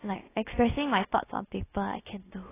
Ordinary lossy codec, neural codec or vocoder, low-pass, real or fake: AAC, 16 kbps; codec, 16 kHz, about 1 kbps, DyCAST, with the encoder's durations; 3.6 kHz; fake